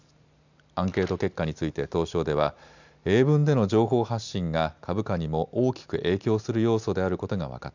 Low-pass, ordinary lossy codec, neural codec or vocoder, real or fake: 7.2 kHz; none; none; real